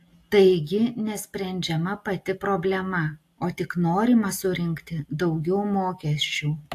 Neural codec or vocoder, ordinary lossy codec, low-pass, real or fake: none; AAC, 64 kbps; 14.4 kHz; real